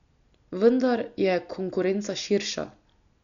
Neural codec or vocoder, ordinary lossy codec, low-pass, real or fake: none; none; 7.2 kHz; real